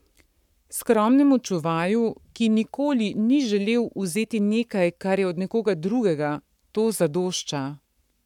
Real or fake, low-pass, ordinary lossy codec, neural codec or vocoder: fake; 19.8 kHz; none; codec, 44.1 kHz, 7.8 kbps, Pupu-Codec